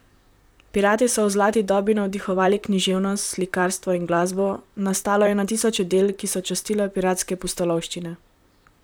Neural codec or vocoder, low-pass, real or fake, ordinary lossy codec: vocoder, 44.1 kHz, 128 mel bands, Pupu-Vocoder; none; fake; none